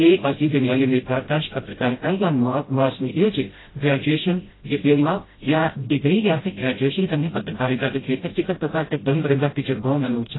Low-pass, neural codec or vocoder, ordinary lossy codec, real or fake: 7.2 kHz; codec, 16 kHz, 0.5 kbps, FreqCodec, smaller model; AAC, 16 kbps; fake